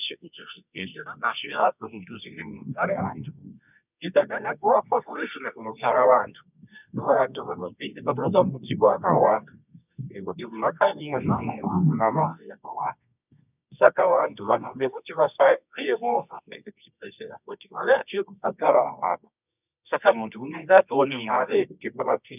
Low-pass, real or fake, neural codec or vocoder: 3.6 kHz; fake; codec, 24 kHz, 0.9 kbps, WavTokenizer, medium music audio release